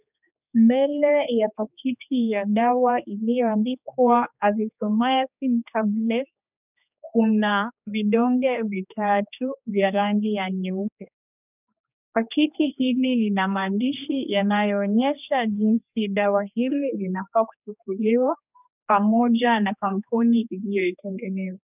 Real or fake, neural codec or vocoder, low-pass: fake; codec, 16 kHz, 2 kbps, X-Codec, HuBERT features, trained on general audio; 3.6 kHz